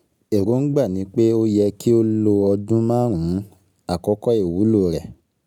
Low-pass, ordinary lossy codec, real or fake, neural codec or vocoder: 19.8 kHz; none; real; none